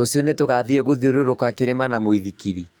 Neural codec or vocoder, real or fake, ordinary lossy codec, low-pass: codec, 44.1 kHz, 2.6 kbps, SNAC; fake; none; none